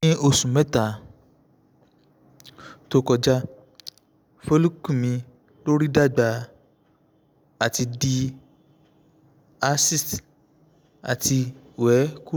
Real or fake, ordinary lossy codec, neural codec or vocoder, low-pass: real; none; none; none